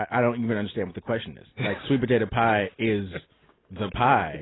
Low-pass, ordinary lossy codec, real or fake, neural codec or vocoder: 7.2 kHz; AAC, 16 kbps; real; none